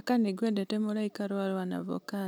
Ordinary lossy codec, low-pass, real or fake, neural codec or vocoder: none; 19.8 kHz; real; none